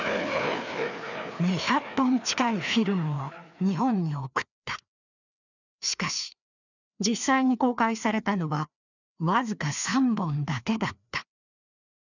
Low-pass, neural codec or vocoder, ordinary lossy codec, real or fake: 7.2 kHz; codec, 16 kHz, 2 kbps, FreqCodec, larger model; none; fake